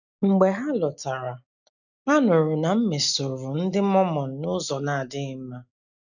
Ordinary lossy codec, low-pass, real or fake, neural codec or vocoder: none; 7.2 kHz; real; none